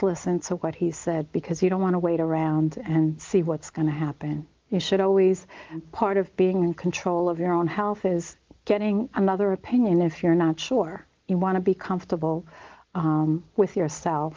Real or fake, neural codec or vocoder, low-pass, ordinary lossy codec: real; none; 7.2 kHz; Opus, 32 kbps